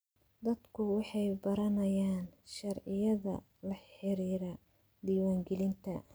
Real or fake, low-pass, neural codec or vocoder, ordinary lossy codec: real; none; none; none